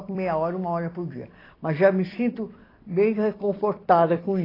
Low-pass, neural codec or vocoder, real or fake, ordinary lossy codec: 5.4 kHz; vocoder, 44.1 kHz, 128 mel bands every 256 samples, BigVGAN v2; fake; AAC, 24 kbps